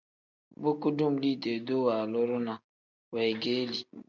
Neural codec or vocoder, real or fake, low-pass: none; real; 7.2 kHz